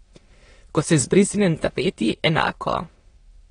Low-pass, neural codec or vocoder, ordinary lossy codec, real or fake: 9.9 kHz; autoencoder, 22.05 kHz, a latent of 192 numbers a frame, VITS, trained on many speakers; AAC, 32 kbps; fake